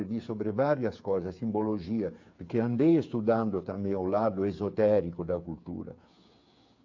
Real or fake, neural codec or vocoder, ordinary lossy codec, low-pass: fake; codec, 16 kHz, 8 kbps, FreqCodec, smaller model; AAC, 48 kbps; 7.2 kHz